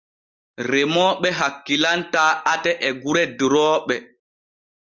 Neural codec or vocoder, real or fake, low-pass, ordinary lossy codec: none; real; 7.2 kHz; Opus, 32 kbps